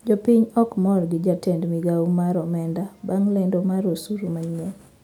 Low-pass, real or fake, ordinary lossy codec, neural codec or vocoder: 19.8 kHz; real; none; none